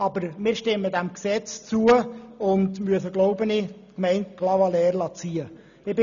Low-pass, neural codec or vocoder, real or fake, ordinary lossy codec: 7.2 kHz; none; real; none